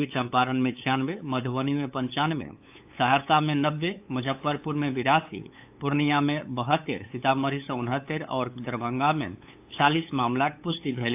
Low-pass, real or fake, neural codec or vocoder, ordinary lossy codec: 3.6 kHz; fake; codec, 16 kHz, 8 kbps, FunCodec, trained on LibriTTS, 25 frames a second; AAC, 32 kbps